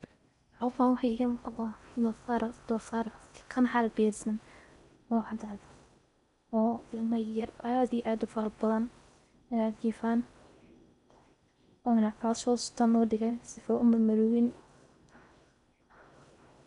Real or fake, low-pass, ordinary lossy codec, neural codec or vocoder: fake; 10.8 kHz; none; codec, 16 kHz in and 24 kHz out, 0.6 kbps, FocalCodec, streaming, 4096 codes